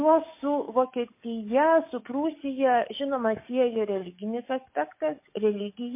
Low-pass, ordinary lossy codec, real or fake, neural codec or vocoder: 3.6 kHz; MP3, 24 kbps; fake; codec, 44.1 kHz, 7.8 kbps, DAC